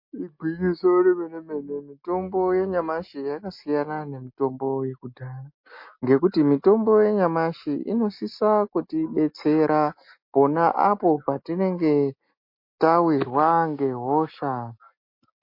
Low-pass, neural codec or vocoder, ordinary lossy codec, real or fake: 5.4 kHz; none; MP3, 32 kbps; real